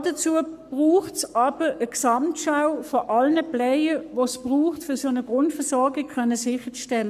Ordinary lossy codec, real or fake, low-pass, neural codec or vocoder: none; fake; 14.4 kHz; codec, 44.1 kHz, 7.8 kbps, Pupu-Codec